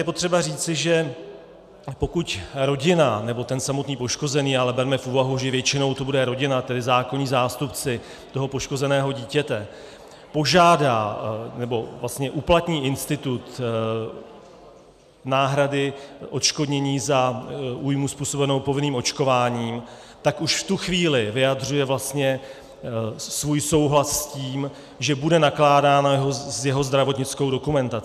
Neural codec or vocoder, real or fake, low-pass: none; real; 14.4 kHz